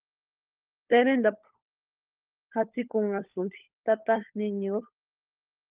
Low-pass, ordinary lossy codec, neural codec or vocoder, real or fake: 3.6 kHz; Opus, 32 kbps; codec, 16 kHz, 16 kbps, FunCodec, trained on LibriTTS, 50 frames a second; fake